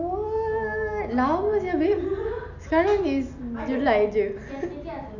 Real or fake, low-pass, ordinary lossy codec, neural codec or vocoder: real; 7.2 kHz; none; none